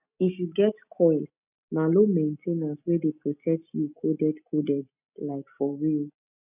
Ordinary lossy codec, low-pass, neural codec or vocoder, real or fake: none; 3.6 kHz; none; real